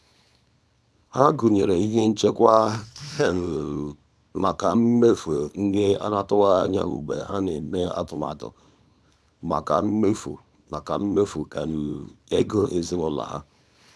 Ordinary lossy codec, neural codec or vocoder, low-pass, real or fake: none; codec, 24 kHz, 0.9 kbps, WavTokenizer, small release; none; fake